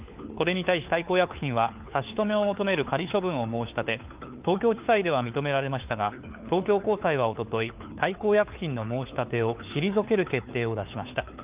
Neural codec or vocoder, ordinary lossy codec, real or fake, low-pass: codec, 16 kHz, 4 kbps, FunCodec, trained on LibriTTS, 50 frames a second; Opus, 24 kbps; fake; 3.6 kHz